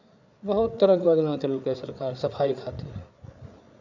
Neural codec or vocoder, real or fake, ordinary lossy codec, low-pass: codec, 16 kHz, 8 kbps, FreqCodec, larger model; fake; none; 7.2 kHz